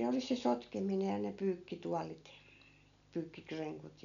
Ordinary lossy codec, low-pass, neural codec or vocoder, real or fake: none; 7.2 kHz; none; real